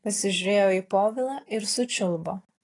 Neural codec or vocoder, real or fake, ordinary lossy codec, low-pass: none; real; AAC, 32 kbps; 10.8 kHz